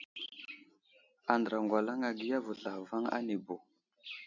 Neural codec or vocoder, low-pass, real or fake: none; 7.2 kHz; real